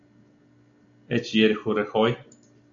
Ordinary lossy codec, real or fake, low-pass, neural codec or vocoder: MP3, 96 kbps; real; 7.2 kHz; none